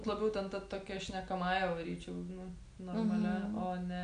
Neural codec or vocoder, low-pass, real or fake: none; 9.9 kHz; real